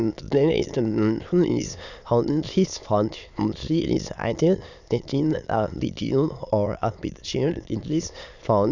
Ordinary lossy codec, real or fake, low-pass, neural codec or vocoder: none; fake; 7.2 kHz; autoencoder, 22.05 kHz, a latent of 192 numbers a frame, VITS, trained on many speakers